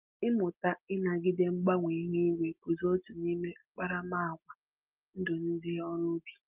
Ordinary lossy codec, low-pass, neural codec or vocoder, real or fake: Opus, 32 kbps; 3.6 kHz; none; real